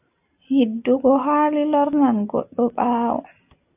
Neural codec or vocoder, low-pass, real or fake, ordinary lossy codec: none; 3.6 kHz; real; AAC, 32 kbps